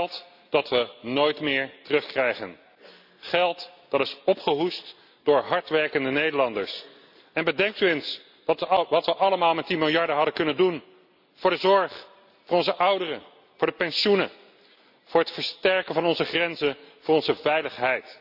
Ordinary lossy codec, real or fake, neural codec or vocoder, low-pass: none; real; none; 5.4 kHz